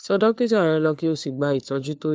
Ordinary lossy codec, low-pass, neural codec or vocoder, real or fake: none; none; codec, 16 kHz, 4 kbps, FunCodec, trained on LibriTTS, 50 frames a second; fake